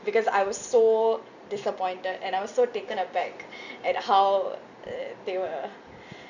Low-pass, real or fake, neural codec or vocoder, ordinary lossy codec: 7.2 kHz; real; none; none